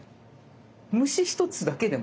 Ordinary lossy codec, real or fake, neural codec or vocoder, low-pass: none; real; none; none